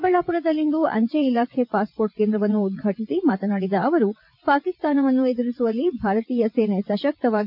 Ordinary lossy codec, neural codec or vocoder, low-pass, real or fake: none; vocoder, 22.05 kHz, 80 mel bands, WaveNeXt; 5.4 kHz; fake